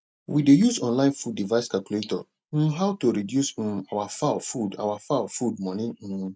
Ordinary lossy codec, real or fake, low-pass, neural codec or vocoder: none; real; none; none